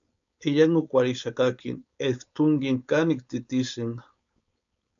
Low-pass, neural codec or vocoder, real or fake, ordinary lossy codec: 7.2 kHz; codec, 16 kHz, 4.8 kbps, FACodec; fake; AAC, 64 kbps